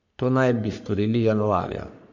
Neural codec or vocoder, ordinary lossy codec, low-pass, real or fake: codec, 44.1 kHz, 3.4 kbps, Pupu-Codec; AAC, 48 kbps; 7.2 kHz; fake